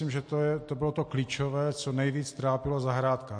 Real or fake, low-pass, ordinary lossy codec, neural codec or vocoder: real; 9.9 kHz; MP3, 48 kbps; none